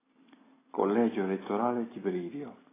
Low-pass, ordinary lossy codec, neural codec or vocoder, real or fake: 3.6 kHz; AAC, 16 kbps; none; real